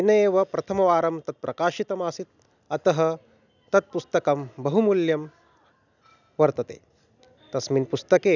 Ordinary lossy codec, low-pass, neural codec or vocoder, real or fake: none; 7.2 kHz; none; real